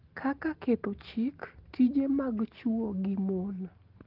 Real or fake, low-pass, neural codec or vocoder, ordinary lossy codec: real; 5.4 kHz; none; Opus, 16 kbps